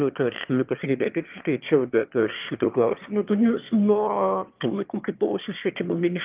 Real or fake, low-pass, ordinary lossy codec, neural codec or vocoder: fake; 3.6 kHz; Opus, 32 kbps; autoencoder, 22.05 kHz, a latent of 192 numbers a frame, VITS, trained on one speaker